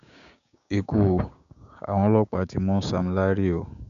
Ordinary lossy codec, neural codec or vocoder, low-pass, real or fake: none; codec, 16 kHz, 6 kbps, DAC; 7.2 kHz; fake